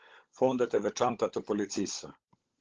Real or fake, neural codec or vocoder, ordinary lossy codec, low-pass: fake; codec, 16 kHz, 16 kbps, FunCodec, trained on LibriTTS, 50 frames a second; Opus, 16 kbps; 7.2 kHz